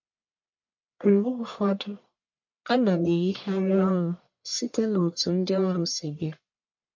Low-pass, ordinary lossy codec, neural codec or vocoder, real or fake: 7.2 kHz; MP3, 48 kbps; codec, 44.1 kHz, 1.7 kbps, Pupu-Codec; fake